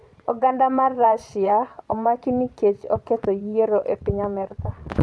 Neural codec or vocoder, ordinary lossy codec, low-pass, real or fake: vocoder, 22.05 kHz, 80 mel bands, Vocos; none; none; fake